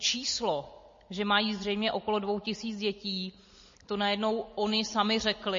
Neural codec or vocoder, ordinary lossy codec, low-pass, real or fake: none; MP3, 32 kbps; 7.2 kHz; real